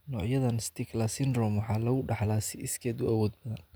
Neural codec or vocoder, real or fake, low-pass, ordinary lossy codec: none; real; none; none